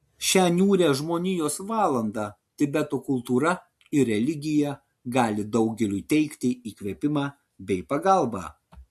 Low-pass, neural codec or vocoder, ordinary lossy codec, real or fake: 14.4 kHz; none; MP3, 64 kbps; real